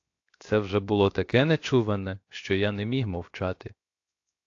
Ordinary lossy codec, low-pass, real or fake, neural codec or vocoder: AAC, 48 kbps; 7.2 kHz; fake; codec, 16 kHz, 0.7 kbps, FocalCodec